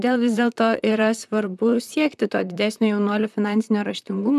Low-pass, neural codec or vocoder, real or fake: 14.4 kHz; vocoder, 44.1 kHz, 128 mel bands, Pupu-Vocoder; fake